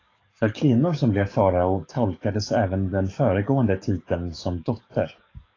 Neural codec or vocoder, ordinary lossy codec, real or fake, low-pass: codec, 44.1 kHz, 7.8 kbps, DAC; AAC, 32 kbps; fake; 7.2 kHz